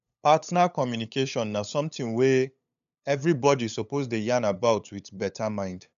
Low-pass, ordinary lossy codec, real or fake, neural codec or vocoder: 7.2 kHz; none; fake; codec, 16 kHz, 8 kbps, FunCodec, trained on LibriTTS, 25 frames a second